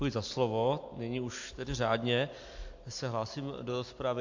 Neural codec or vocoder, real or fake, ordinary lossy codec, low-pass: none; real; AAC, 48 kbps; 7.2 kHz